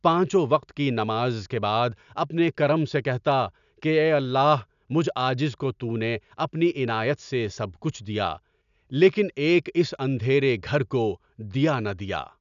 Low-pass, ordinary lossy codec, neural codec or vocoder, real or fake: 7.2 kHz; none; none; real